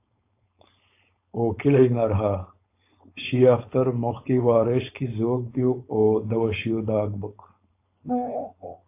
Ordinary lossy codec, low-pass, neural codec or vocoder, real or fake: AAC, 24 kbps; 3.6 kHz; codec, 16 kHz, 4.8 kbps, FACodec; fake